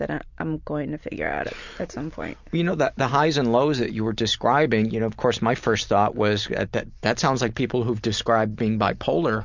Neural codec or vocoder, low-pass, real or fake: none; 7.2 kHz; real